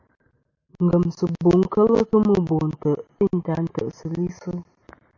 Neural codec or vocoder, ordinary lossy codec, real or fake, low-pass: none; MP3, 48 kbps; real; 7.2 kHz